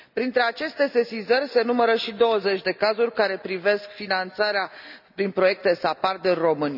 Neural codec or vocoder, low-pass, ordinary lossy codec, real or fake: none; 5.4 kHz; none; real